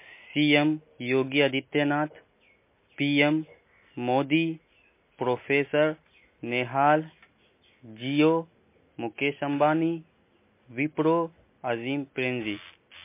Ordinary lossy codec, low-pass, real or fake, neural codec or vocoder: MP3, 24 kbps; 3.6 kHz; real; none